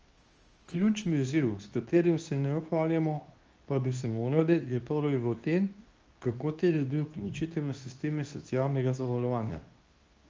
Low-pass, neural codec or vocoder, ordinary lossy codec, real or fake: 7.2 kHz; codec, 24 kHz, 0.9 kbps, WavTokenizer, medium speech release version 2; Opus, 24 kbps; fake